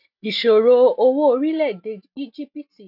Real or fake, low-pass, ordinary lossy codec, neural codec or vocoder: real; 5.4 kHz; none; none